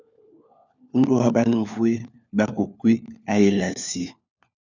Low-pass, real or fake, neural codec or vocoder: 7.2 kHz; fake; codec, 16 kHz, 4 kbps, FunCodec, trained on LibriTTS, 50 frames a second